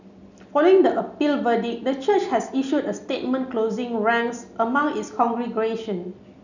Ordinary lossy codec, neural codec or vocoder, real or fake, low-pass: none; none; real; 7.2 kHz